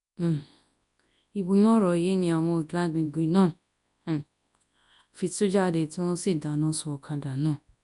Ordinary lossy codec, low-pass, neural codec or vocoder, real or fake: none; 10.8 kHz; codec, 24 kHz, 0.9 kbps, WavTokenizer, large speech release; fake